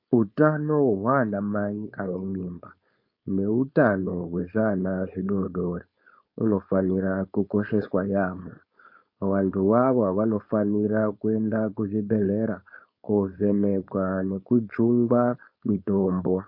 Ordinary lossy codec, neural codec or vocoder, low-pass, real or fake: MP3, 32 kbps; codec, 16 kHz, 4.8 kbps, FACodec; 5.4 kHz; fake